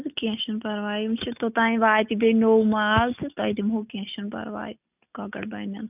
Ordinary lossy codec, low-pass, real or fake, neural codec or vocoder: none; 3.6 kHz; real; none